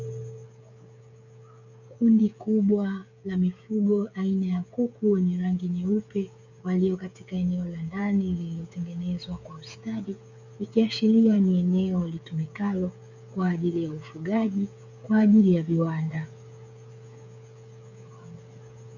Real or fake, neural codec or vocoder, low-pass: fake; codec, 16 kHz, 8 kbps, FreqCodec, smaller model; 7.2 kHz